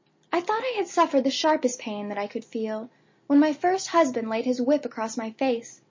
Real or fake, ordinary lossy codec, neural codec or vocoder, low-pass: real; MP3, 32 kbps; none; 7.2 kHz